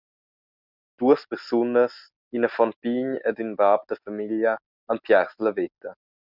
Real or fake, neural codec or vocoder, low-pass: real; none; 5.4 kHz